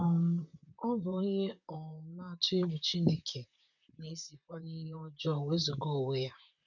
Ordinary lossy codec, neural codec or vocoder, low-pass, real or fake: none; vocoder, 44.1 kHz, 128 mel bands, Pupu-Vocoder; 7.2 kHz; fake